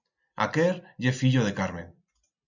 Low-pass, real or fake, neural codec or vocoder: 7.2 kHz; real; none